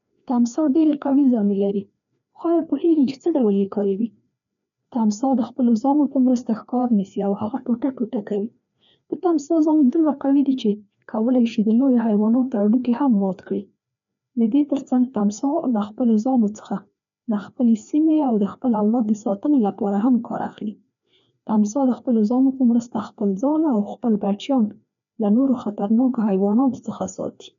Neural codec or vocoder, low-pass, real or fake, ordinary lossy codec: codec, 16 kHz, 2 kbps, FreqCodec, larger model; 7.2 kHz; fake; MP3, 96 kbps